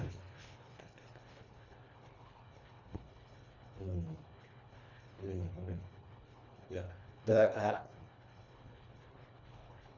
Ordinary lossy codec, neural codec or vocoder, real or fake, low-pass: none; codec, 24 kHz, 1.5 kbps, HILCodec; fake; 7.2 kHz